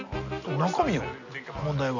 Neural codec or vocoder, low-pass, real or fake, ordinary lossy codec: none; 7.2 kHz; real; none